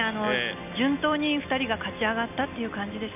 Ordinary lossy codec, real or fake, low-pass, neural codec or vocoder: none; real; 3.6 kHz; none